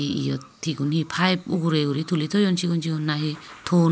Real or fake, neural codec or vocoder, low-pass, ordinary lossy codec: real; none; none; none